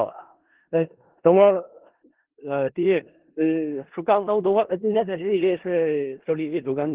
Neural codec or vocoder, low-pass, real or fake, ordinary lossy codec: codec, 16 kHz in and 24 kHz out, 0.4 kbps, LongCat-Audio-Codec, four codebook decoder; 3.6 kHz; fake; Opus, 16 kbps